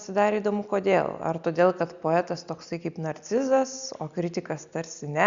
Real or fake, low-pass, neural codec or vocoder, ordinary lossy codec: real; 7.2 kHz; none; Opus, 64 kbps